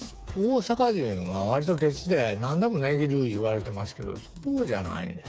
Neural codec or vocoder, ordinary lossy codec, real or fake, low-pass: codec, 16 kHz, 4 kbps, FreqCodec, smaller model; none; fake; none